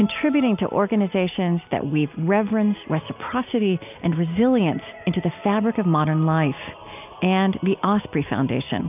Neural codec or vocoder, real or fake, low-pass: none; real; 3.6 kHz